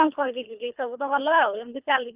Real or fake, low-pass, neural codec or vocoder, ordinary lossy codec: fake; 3.6 kHz; codec, 24 kHz, 3 kbps, HILCodec; Opus, 24 kbps